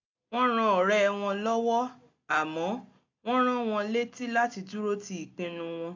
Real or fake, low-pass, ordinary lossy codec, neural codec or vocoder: real; 7.2 kHz; AAC, 48 kbps; none